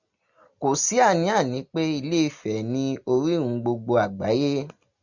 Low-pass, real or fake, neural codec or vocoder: 7.2 kHz; real; none